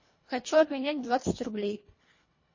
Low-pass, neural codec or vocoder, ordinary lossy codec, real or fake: 7.2 kHz; codec, 24 kHz, 1.5 kbps, HILCodec; MP3, 32 kbps; fake